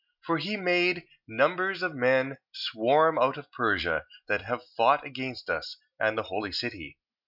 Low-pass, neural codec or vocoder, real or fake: 5.4 kHz; none; real